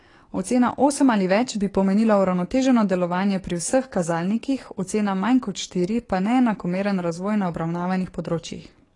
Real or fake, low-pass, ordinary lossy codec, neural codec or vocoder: fake; 10.8 kHz; AAC, 32 kbps; codec, 44.1 kHz, 7.8 kbps, DAC